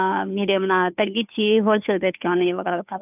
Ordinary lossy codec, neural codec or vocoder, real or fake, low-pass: none; codec, 16 kHz, 2 kbps, FunCodec, trained on Chinese and English, 25 frames a second; fake; 3.6 kHz